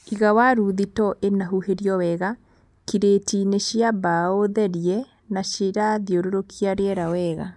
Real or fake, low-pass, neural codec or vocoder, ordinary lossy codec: real; 10.8 kHz; none; none